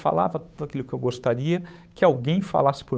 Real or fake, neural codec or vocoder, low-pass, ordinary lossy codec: fake; codec, 16 kHz, 8 kbps, FunCodec, trained on Chinese and English, 25 frames a second; none; none